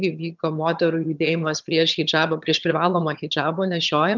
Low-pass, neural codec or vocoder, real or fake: 7.2 kHz; vocoder, 22.05 kHz, 80 mel bands, HiFi-GAN; fake